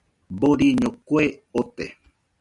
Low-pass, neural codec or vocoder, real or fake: 10.8 kHz; none; real